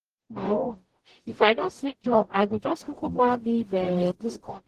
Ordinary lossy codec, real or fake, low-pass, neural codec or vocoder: Opus, 16 kbps; fake; 14.4 kHz; codec, 44.1 kHz, 0.9 kbps, DAC